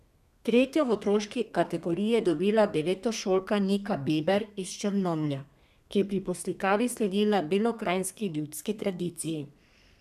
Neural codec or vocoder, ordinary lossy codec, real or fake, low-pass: codec, 32 kHz, 1.9 kbps, SNAC; none; fake; 14.4 kHz